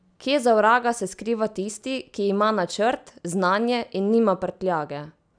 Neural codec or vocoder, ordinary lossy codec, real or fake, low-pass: none; none; real; 9.9 kHz